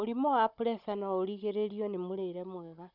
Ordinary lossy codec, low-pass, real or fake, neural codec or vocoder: none; 5.4 kHz; fake; codec, 16 kHz, 16 kbps, FunCodec, trained on Chinese and English, 50 frames a second